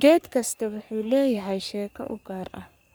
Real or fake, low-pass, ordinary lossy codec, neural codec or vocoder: fake; none; none; codec, 44.1 kHz, 3.4 kbps, Pupu-Codec